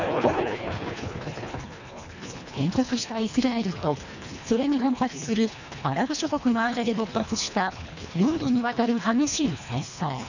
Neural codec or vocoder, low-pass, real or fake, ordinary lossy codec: codec, 24 kHz, 1.5 kbps, HILCodec; 7.2 kHz; fake; none